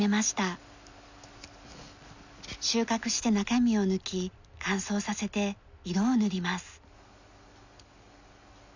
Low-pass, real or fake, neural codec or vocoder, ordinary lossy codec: 7.2 kHz; real; none; none